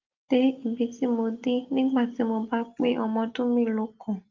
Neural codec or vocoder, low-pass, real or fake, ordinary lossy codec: none; 7.2 kHz; real; Opus, 24 kbps